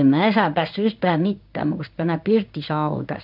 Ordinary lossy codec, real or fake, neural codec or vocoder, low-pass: none; fake; codec, 16 kHz in and 24 kHz out, 1 kbps, XY-Tokenizer; 5.4 kHz